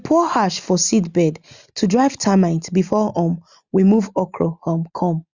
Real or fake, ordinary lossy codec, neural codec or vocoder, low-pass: real; Opus, 64 kbps; none; 7.2 kHz